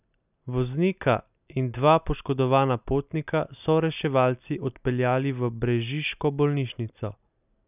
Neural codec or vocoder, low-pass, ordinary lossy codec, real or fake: none; 3.6 kHz; none; real